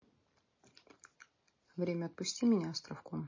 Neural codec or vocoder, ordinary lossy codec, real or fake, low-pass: none; MP3, 32 kbps; real; 7.2 kHz